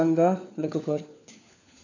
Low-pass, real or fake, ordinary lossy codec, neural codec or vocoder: 7.2 kHz; fake; none; codec, 16 kHz, 4 kbps, FunCodec, trained on LibriTTS, 50 frames a second